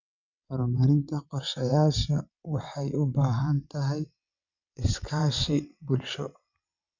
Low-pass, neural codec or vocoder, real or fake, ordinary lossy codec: 7.2 kHz; vocoder, 22.05 kHz, 80 mel bands, WaveNeXt; fake; none